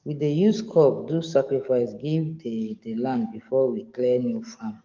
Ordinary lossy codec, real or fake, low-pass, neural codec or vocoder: Opus, 24 kbps; fake; 7.2 kHz; codec, 44.1 kHz, 7.8 kbps, DAC